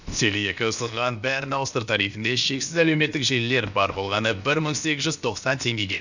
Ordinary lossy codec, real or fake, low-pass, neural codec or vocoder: none; fake; 7.2 kHz; codec, 16 kHz, about 1 kbps, DyCAST, with the encoder's durations